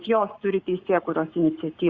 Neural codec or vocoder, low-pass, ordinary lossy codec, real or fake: codec, 44.1 kHz, 7.8 kbps, Pupu-Codec; 7.2 kHz; Opus, 64 kbps; fake